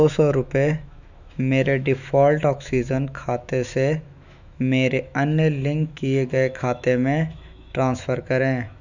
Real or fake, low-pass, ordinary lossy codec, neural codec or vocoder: fake; 7.2 kHz; none; autoencoder, 48 kHz, 128 numbers a frame, DAC-VAE, trained on Japanese speech